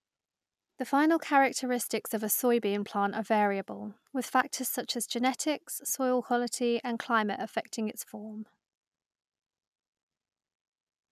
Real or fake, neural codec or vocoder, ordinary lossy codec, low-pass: real; none; none; 14.4 kHz